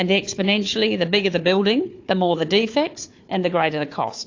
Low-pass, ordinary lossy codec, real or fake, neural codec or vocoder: 7.2 kHz; AAC, 48 kbps; fake; codec, 16 kHz, 4 kbps, FunCodec, trained on Chinese and English, 50 frames a second